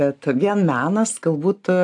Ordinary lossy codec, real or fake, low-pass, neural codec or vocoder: AAC, 64 kbps; real; 10.8 kHz; none